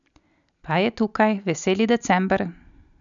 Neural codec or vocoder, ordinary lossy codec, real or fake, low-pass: none; none; real; 7.2 kHz